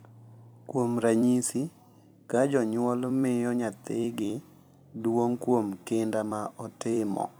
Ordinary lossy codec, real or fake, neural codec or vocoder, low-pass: none; fake; vocoder, 44.1 kHz, 128 mel bands every 256 samples, BigVGAN v2; none